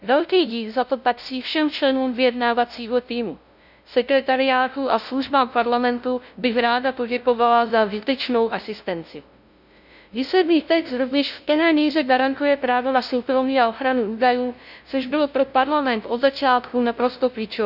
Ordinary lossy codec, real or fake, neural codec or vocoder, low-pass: none; fake; codec, 16 kHz, 0.5 kbps, FunCodec, trained on LibriTTS, 25 frames a second; 5.4 kHz